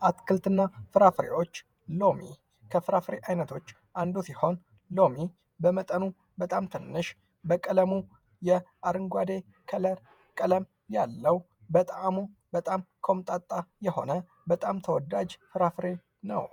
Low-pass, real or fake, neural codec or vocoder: 19.8 kHz; fake; vocoder, 48 kHz, 128 mel bands, Vocos